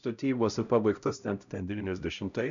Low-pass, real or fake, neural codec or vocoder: 7.2 kHz; fake; codec, 16 kHz, 0.5 kbps, X-Codec, HuBERT features, trained on LibriSpeech